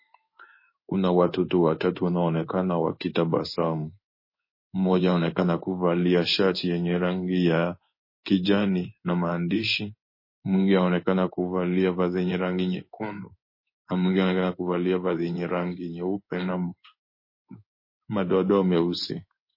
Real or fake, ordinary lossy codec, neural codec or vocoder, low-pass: fake; MP3, 24 kbps; codec, 16 kHz in and 24 kHz out, 1 kbps, XY-Tokenizer; 5.4 kHz